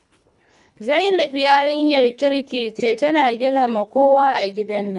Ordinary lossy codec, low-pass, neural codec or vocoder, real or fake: none; 10.8 kHz; codec, 24 kHz, 1.5 kbps, HILCodec; fake